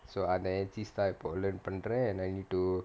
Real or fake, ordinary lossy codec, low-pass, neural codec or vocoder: real; none; none; none